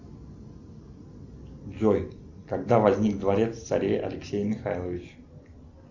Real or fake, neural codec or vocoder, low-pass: real; none; 7.2 kHz